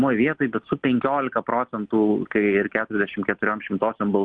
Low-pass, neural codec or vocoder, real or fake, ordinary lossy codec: 9.9 kHz; none; real; Opus, 32 kbps